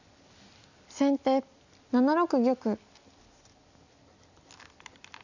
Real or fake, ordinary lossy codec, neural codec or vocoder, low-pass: real; none; none; 7.2 kHz